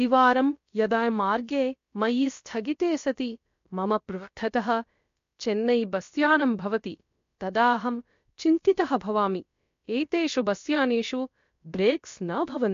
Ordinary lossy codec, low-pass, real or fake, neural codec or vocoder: MP3, 48 kbps; 7.2 kHz; fake; codec, 16 kHz, about 1 kbps, DyCAST, with the encoder's durations